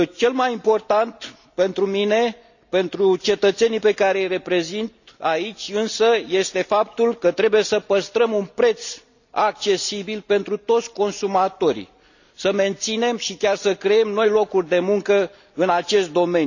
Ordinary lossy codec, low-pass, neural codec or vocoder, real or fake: none; 7.2 kHz; none; real